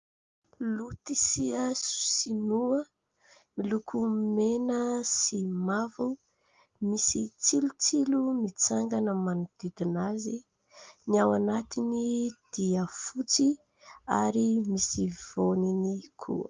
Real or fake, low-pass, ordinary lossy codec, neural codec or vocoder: real; 7.2 kHz; Opus, 32 kbps; none